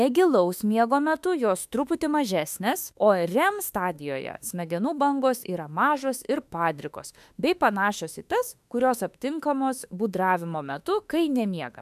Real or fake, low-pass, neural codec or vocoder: fake; 14.4 kHz; autoencoder, 48 kHz, 32 numbers a frame, DAC-VAE, trained on Japanese speech